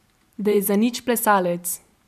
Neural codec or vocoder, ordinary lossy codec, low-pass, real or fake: vocoder, 44.1 kHz, 128 mel bands every 512 samples, BigVGAN v2; none; 14.4 kHz; fake